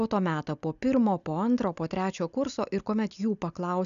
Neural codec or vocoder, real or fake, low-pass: none; real; 7.2 kHz